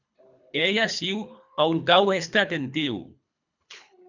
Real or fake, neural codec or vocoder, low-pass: fake; codec, 24 kHz, 3 kbps, HILCodec; 7.2 kHz